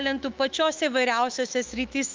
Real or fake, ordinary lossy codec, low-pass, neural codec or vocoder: fake; Opus, 32 kbps; 7.2 kHz; autoencoder, 48 kHz, 128 numbers a frame, DAC-VAE, trained on Japanese speech